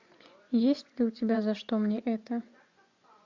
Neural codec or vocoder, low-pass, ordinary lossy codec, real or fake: vocoder, 24 kHz, 100 mel bands, Vocos; 7.2 kHz; MP3, 64 kbps; fake